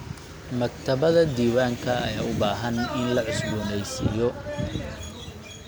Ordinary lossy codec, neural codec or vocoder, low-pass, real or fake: none; none; none; real